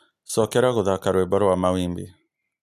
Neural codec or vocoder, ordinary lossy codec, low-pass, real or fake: none; none; 14.4 kHz; real